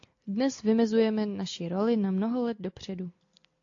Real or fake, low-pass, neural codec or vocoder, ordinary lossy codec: real; 7.2 kHz; none; AAC, 48 kbps